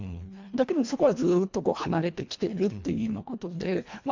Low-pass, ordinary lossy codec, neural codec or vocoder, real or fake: 7.2 kHz; MP3, 64 kbps; codec, 24 kHz, 1.5 kbps, HILCodec; fake